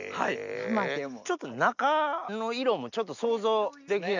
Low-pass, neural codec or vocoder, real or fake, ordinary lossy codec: 7.2 kHz; none; real; none